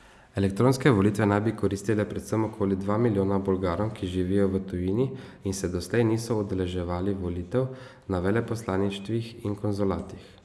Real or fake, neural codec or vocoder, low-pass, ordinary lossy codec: real; none; none; none